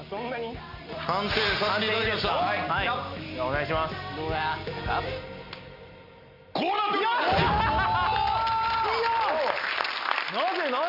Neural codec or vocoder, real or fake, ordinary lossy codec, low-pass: none; real; none; 5.4 kHz